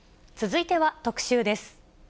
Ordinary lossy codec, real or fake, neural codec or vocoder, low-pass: none; real; none; none